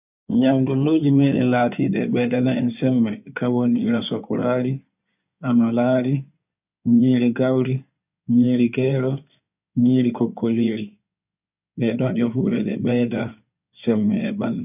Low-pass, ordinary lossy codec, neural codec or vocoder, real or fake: 3.6 kHz; none; codec, 16 kHz in and 24 kHz out, 2.2 kbps, FireRedTTS-2 codec; fake